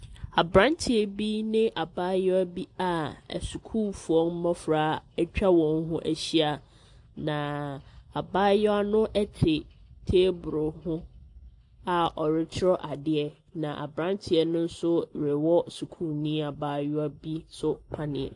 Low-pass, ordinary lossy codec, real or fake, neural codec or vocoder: 10.8 kHz; AAC, 64 kbps; real; none